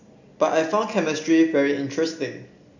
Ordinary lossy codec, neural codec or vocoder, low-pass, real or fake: none; none; 7.2 kHz; real